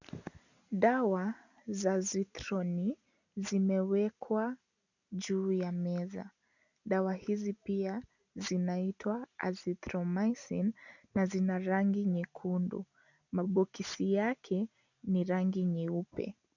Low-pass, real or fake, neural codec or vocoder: 7.2 kHz; real; none